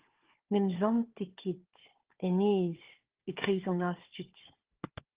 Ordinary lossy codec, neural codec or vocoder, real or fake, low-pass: Opus, 16 kbps; codec, 16 kHz, 4 kbps, FunCodec, trained on Chinese and English, 50 frames a second; fake; 3.6 kHz